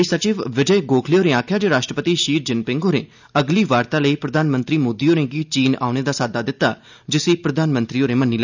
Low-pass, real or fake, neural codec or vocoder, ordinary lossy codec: 7.2 kHz; real; none; none